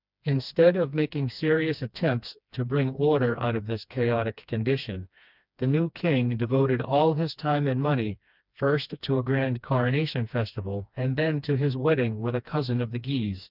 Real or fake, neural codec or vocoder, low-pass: fake; codec, 16 kHz, 2 kbps, FreqCodec, smaller model; 5.4 kHz